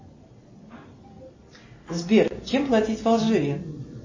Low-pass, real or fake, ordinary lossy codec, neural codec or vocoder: 7.2 kHz; real; MP3, 32 kbps; none